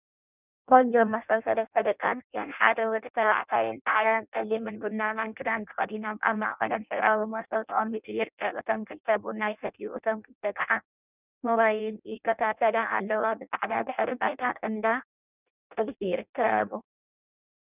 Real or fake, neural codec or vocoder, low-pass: fake; codec, 16 kHz in and 24 kHz out, 0.6 kbps, FireRedTTS-2 codec; 3.6 kHz